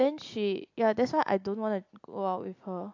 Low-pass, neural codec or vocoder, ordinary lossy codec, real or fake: 7.2 kHz; none; AAC, 48 kbps; real